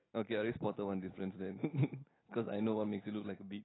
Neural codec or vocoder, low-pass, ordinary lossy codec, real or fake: none; 7.2 kHz; AAC, 16 kbps; real